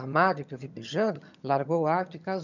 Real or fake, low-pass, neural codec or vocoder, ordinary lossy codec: fake; 7.2 kHz; vocoder, 22.05 kHz, 80 mel bands, HiFi-GAN; none